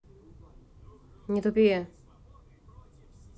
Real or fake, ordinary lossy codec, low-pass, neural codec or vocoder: real; none; none; none